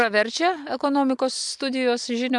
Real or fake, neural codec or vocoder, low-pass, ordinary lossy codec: real; none; 10.8 kHz; MP3, 64 kbps